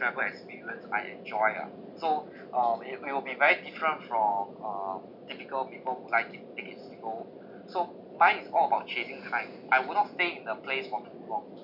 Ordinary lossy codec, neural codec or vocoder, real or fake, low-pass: none; none; real; 5.4 kHz